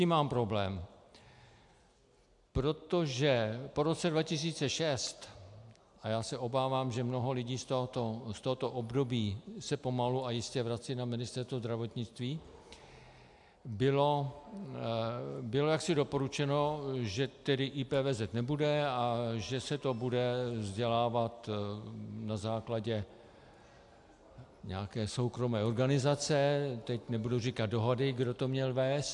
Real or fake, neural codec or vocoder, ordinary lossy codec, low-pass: real; none; AAC, 64 kbps; 10.8 kHz